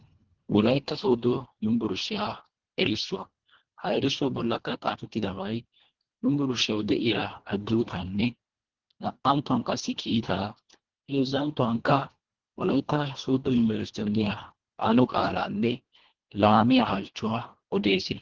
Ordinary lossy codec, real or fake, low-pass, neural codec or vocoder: Opus, 16 kbps; fake; 7.2 kHz; codec, 24 kHz, 1.5 kbps, HILCodec